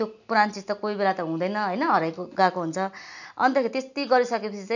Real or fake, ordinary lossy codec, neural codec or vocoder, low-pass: real; none; none; 7.2 kHz